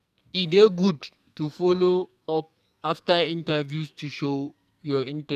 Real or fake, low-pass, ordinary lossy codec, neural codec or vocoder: fake; 14.4 kHz; none; codec, 44.1 kHz, 2.6 kbps, SNAC